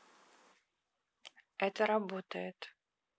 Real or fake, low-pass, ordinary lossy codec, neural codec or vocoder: real; none; none; none